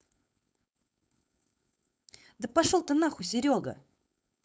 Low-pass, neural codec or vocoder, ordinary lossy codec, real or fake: none; codec, 16 kHz, 4.8 kbps, FACodec; none; fake